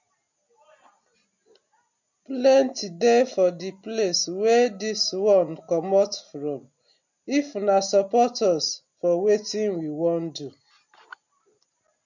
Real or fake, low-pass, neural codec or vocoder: real; 7.2 kHz; none